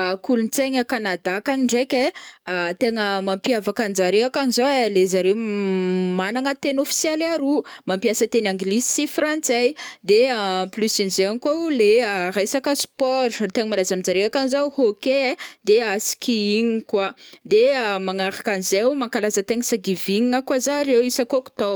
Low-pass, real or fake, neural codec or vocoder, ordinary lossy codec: none; fake; codec, 44.1 kHz, 7.8 kbps, DAC; none